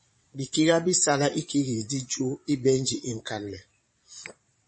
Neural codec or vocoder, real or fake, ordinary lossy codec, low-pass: vocoder, 22.05 kHz, 80 mel bands, Vocos; fake; MP3, 32 kbps; 9.9 kHz